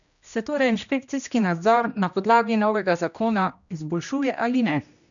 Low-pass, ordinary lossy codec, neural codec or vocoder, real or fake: 7.2 kHz; none; codec, 16 kHz, 1 kbps, X-Codec, HuBERT features, trained on general audio; fake